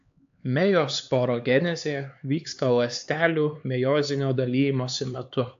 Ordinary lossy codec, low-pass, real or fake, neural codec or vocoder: AAC, 64 kbps; 7.2 kHz; fake; codec, 16 kHz, 4 kbps, X-Codec, HuBERT features, trained on LibriSpeech